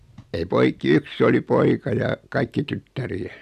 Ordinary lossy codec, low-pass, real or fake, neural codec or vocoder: none; 14.4 kHz; real; none